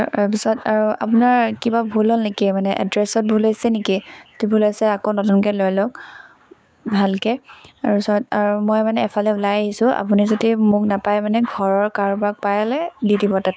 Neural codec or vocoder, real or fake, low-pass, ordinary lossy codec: codec, 16 kHz, 6 kbps, DAC; fake; none; none